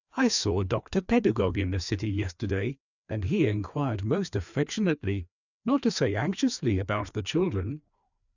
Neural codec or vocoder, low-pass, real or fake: codec, 16 kHz, 2 kbps, FreqCodec, larger model; 7.2 kHz; fake